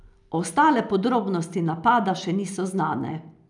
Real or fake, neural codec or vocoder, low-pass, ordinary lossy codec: real; none; 10.8 kHz; none